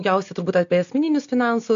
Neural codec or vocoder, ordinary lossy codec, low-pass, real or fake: none; AAC, 64 kbps; 7.2 kHz; real